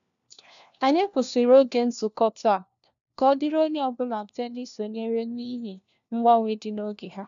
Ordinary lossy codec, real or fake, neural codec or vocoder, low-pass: none; fake; codec, 16 kHz, 1 kbps, FunCodec, trained on LibriTTS, 50 frames a second; 7.2 kHz